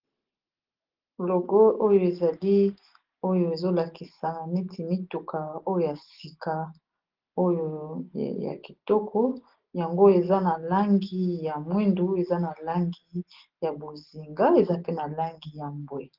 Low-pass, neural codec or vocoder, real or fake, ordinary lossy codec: 5.4 kHz; none; real; Opus, 16 kbps